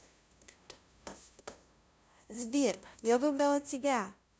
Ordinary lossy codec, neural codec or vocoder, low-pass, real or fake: none; codec, 16 kHz, 0.5 kbps, FunCodec, trained on LibriTTS, 25 frames a second; none; fake